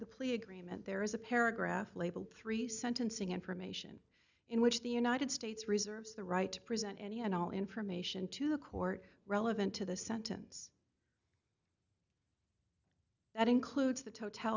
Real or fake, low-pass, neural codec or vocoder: real; 7.2 kHz; none